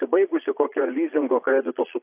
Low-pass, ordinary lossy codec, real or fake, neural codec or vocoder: 3.6 kHz; AAC, 24 kbps; fake; vocoder, 22.05 kHz, 80 mel bands, Vocos